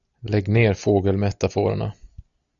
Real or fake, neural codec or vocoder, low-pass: real; none; 7.2 kHz